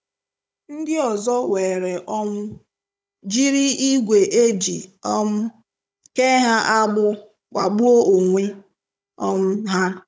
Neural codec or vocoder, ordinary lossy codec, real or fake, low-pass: codec, 16 kHz, 16 kbps, FunCodec, trained on Chinese and English, 50 frames a second; none; fake; none